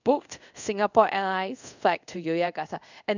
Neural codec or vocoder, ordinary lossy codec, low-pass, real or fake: codec, 24 kHz, 0.5 kbps, DualCodec; none; 7.2 kHz; fake